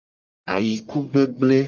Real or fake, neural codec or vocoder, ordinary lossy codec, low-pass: fake; codec, 44.1 kHz, 1.7 kbps, Pupu-Codec; Opus, 32 kbps; 7.2 kHz